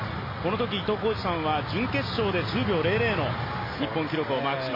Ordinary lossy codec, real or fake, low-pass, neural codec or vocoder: MP3, 24 kbps; real; 5.4 kHz; none